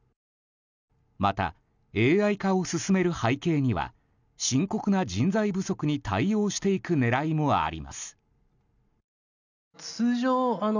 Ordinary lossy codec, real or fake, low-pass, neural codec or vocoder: none; real; 7.2 kHz; none